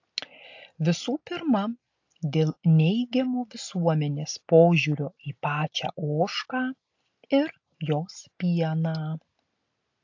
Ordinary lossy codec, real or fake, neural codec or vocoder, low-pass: AAC, 48 kbps; real; none; 7.2 kHz